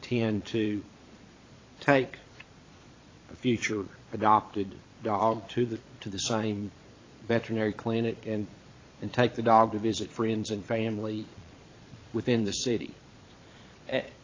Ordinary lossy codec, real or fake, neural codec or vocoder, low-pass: AAC, 32 kbps; fake; vocoder, 22.05 kHz, 80 mel bands, Vocos; 7.2 kHz